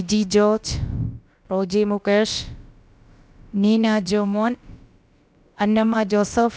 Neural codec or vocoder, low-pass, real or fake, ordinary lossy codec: codec, 16 kHz, about 1 kbps, DyCAST, with the encoder's durations; none; fake; none